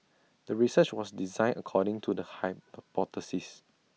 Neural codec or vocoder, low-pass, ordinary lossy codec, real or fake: none; none; none; real